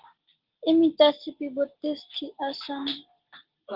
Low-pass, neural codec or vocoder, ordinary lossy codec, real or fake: 5.4 kHz; none; Opus, 16 kbps; real